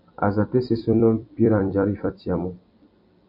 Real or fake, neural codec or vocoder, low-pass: fake; vocoder, 44.1 kHz, 128 mel bands every 256 samples, BigVGAN v2; 5.4 kHz